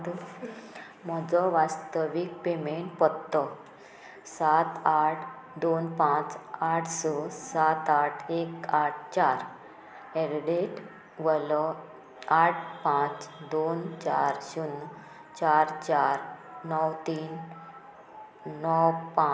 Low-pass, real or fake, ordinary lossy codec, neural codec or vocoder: none; real; none; none